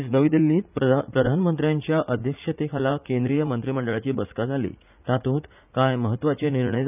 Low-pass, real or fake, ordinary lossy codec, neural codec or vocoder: 3.6 kHz; fake; none; vocoder, 44.1 kHz, 80 mel bands, Vocos